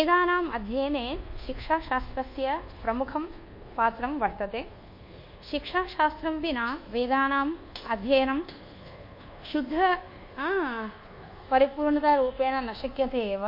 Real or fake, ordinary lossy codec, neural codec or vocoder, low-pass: fake; MP3, 32 kbps; codec, 24 kHz, 1.2 kbps, DualCodec; 5.4 kHz